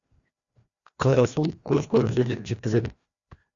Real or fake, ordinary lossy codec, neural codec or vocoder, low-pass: fake; Opus, 64 kbps; codec, 16 kHz, 1 kbps, FreqCodec, larger model; 7.2 kHz